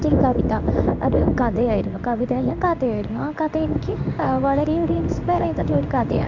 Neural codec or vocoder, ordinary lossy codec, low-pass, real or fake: codec, 16 kHz in and 24 kHz out, 1 kbps, XY-Tokenizer; MP3, 64 kbps; 7.2 kHz; fake